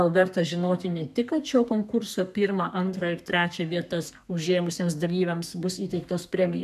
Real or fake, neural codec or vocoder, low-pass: fake; codec, 32 kHz, 1.9 kbps, SNAC; 14.4 kHz